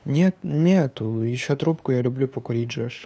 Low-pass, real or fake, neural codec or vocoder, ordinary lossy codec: none; fake; codec, 16 kHz, 2 kbps, FunCodec, trained on LibriTTS, 25 frames a second; none